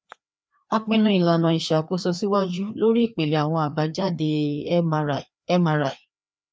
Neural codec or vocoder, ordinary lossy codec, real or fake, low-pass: codec, 16 kHz, 2 kbps, FreqCodec, larger model; none; fake; none